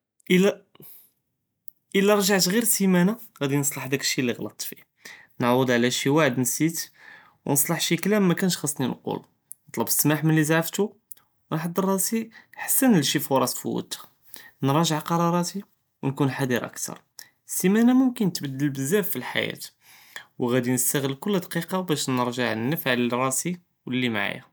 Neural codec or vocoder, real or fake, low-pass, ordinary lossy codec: none; real; none; none